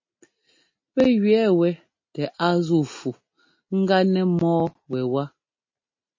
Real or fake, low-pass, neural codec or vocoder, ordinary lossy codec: real; 7.2 kHz; none; MP3, 32 kbps